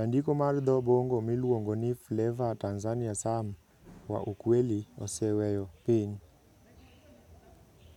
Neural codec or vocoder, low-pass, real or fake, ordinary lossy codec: none; 19.8 kHz; real; none